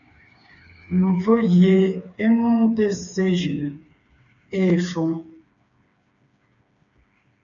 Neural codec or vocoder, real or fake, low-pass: codec, 16 kHz, 4 kbps, FreqCodec, smaller model; fake; 7.2 kHz